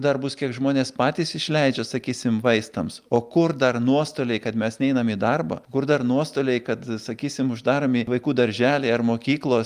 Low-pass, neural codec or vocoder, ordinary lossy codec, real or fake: 14.4 kHz; none; Opus, 32 kbps; real